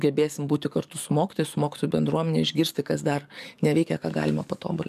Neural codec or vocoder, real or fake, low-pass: codec, 44.1 kHz, 7.8 kbps, DAC; fake; 14.4 kHz